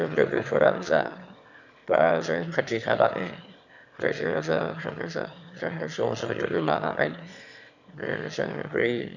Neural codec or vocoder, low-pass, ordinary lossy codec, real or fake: autoencoder, 22.05 kHz, a latent of 192 numbers a frame, VITS, trained on one speaker; 7.2 kHz; none; fake